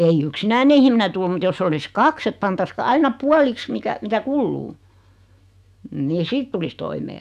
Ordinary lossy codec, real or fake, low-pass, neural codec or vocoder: none; fake; 14.4 kHz; autoencoder, 48 kHz, 128 numbers a frame, DAC-VAE, trained on Japanese speech